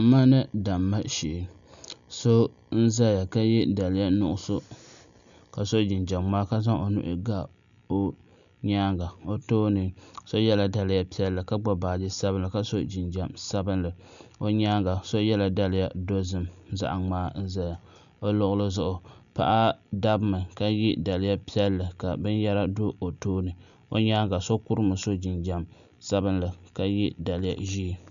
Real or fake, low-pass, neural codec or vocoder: real; 7.2 kHz; none